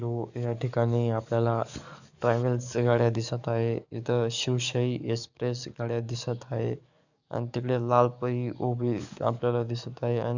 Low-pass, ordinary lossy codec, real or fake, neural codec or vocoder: 7.2 kHz; none; fake; codec, 44.1 kHz, 7.8 kbps, DAC